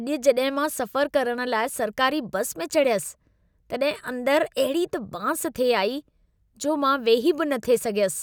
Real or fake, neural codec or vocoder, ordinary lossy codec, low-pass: real; none; none; none